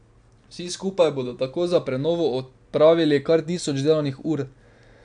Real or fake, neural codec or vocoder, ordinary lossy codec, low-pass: real; none; none; 9.9 kHz